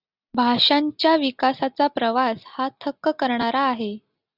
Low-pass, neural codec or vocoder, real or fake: 5.4 kHz; none; real